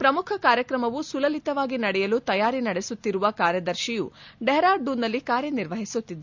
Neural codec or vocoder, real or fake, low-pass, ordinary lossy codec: none; real; 7.2 kHz; MP3, 64 kbps